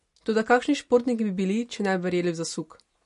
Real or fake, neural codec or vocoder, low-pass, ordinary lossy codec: real; none; 10.8 kHz; MP3, 48 kbps